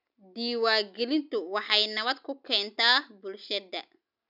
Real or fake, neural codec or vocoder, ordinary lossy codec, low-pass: real; none; none; 5.4 kHz